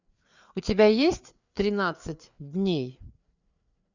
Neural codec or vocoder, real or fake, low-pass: codec, 16 kHz, 4 kbps, FreqCodec, larger model; fake; 7.2 kHz